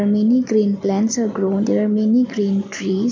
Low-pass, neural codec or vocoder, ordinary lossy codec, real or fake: none; none; none; real